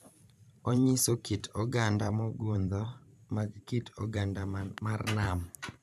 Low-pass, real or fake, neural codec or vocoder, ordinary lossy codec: 14.4 kHz; fake; vocoder, 44.1 kHz, 128 mel bands every 512 samples, BigVGAN v2; none